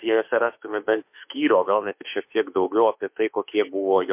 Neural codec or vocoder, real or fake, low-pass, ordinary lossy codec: autoencoder, 48 kHz, 32 numbers a frame, DAC-VAE, trained on Japanese speech; fake; 3.6 kHz; MP3, 32 kbps